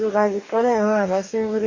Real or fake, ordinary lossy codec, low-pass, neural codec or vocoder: fake; MP3, 48 kbps; 7.2 kHz; codec, 44.1 kHz, 2.6 kbps, DAC